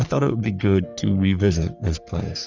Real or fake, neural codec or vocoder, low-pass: fake; codec, 44.1 kHz, 3.4 kbps, Pupu-Codec; 7.2 kHz